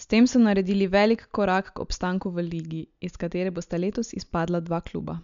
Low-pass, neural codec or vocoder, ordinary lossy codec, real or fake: 7.2 kHz; none; MP3, 64 kbps; real